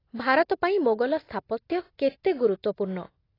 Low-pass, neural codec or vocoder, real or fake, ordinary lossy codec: 5.4 kHz; none; real; AAC, 24 kbps